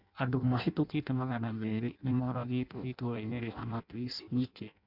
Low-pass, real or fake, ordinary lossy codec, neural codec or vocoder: 5.4 kHz; fake; none; codec, 16 kHz in and 24 kHz out, 0.6 kbps, FireRedTTS-2 codec